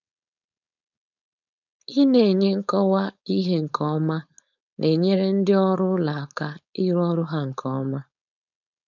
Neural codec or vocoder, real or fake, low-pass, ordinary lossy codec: codec, 16 kHz, 4.8 kbps, FACodec; fake; 7.2 kHz; none